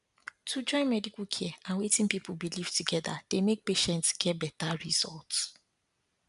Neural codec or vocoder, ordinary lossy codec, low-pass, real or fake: none; none; 10.8 kHz; real